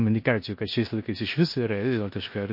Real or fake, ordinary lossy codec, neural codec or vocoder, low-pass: fake; MP3, 32 kbps; codec, 16 kHz in and 24 kHz out, 0.9 kbps, LongCat-Audio-Codec, four codebook decoder; 5.4 kHz